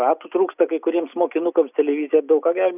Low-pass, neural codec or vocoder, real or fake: 3.6 kHz; none; real